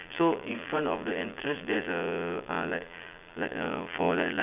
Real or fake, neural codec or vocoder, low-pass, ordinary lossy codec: fake; vocoder, 22.05 kHz, 80 mel bands, Vocos; 3.6 kHz; none